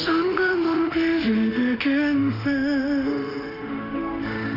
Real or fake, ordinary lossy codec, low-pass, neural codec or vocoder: fake; Opus, 64 kbps; 5.4 kHz; autoencoder, 48 kHz, 32 numbers a frame, DAC-VAE, trained on Japanese speech